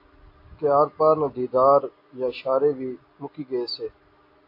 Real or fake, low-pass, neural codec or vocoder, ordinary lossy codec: real; 5.4 kHz; none; AAC, 32 kbps